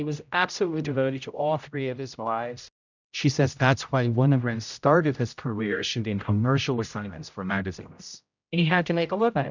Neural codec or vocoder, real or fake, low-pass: codec, 16 kHz, 0.5 kbps, X-Codec, HuBERT features, trained on general audio; fake; 7.2 kHz